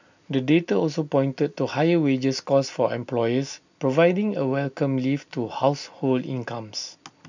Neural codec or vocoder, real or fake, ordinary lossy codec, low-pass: none; real; none; 7.2 kHz